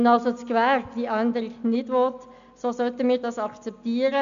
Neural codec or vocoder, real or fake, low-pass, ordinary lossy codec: none; real; 7.2 kHz; none